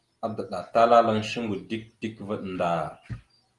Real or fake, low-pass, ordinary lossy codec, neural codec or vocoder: real; 10.8 kHz; Opus, 24 kbps; none